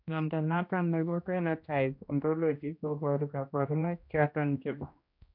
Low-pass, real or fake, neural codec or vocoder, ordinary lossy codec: 5.4 kHz; fake; codec, 16 kHz, 1 kbps, X-Codec, HuBERT features, trained on general audio; MP3, 48 kbps